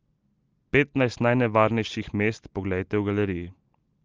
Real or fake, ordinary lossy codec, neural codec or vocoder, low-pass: real; Opus, 24 kbps; none; 7.2 kHz